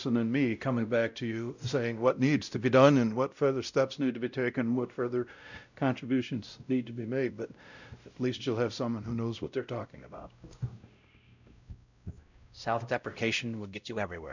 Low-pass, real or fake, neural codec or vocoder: 7.2 kHz; fake; codec, 16 kHz, 0.5 kbps, X-Codec, WavLM features, trained on Multilingual LibriSpeech